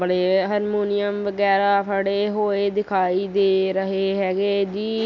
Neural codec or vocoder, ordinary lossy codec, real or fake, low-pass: none; none; real; 7.2 kHz